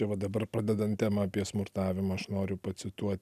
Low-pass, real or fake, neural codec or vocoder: 14.4 kHz; real; none